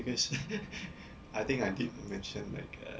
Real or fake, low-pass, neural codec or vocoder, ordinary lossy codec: real; none; none; none